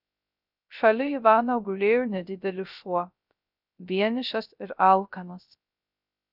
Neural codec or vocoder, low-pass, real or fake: codec, 16 kHz, 0.3 kbps, FocalCodec; 5.4 kHz; fake